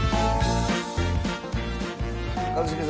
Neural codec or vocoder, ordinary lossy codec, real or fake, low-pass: none; none; real; none